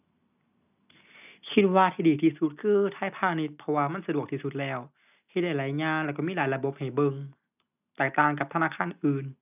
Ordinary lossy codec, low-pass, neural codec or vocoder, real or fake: none; 3.6 kHz; none; real